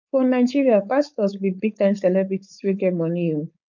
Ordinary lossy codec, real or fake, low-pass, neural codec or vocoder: none; fake; 7.2 kHz; codec, 16 kHz, 4.8 kbps, FACodec